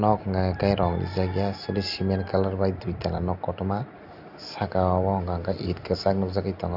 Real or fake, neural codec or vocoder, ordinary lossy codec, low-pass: real; none; none; 5.4 kHz